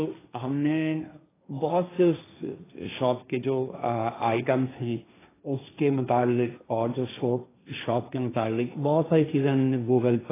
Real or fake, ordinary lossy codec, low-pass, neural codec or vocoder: fake; AAC, 16 kbps; 3.6 kHz; codec, 16 kHz, 1.1 kbps, Voila-Tokenizer